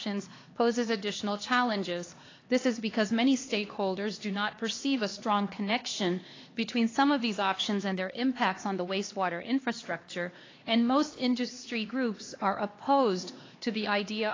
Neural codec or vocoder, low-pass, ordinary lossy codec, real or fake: codec, 16 kHz, 2 kbps, X-Codec, HuBERT features, trained on LibriSpeech; 7.2 kHz; AAC, 32 kbps; fake